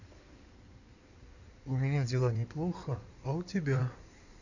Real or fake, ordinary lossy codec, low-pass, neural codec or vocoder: fake; none; 7.2 kHz; codec, 16 kHz in and 24 kHz out, 2.2 kbps, FireRedTTS-2 codec